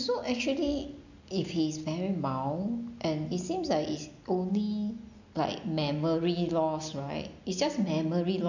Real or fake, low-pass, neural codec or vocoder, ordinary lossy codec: real; 7.2 kHz; none; none